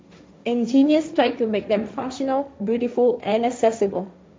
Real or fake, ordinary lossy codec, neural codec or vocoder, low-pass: fake; none; codec, 16 kHz, 1.1 kbps, Voila-Tokenizer; none